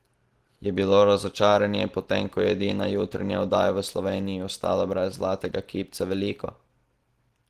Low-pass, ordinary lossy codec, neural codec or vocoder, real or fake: 14.4 kHz; Opus, 16 kbps; none; real